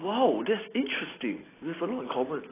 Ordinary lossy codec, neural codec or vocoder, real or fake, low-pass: AAC, 16 kbps; none; real; 3.6 kHz